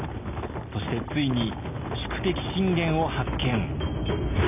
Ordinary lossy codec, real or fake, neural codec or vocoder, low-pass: none; real; none; 3.6 kHz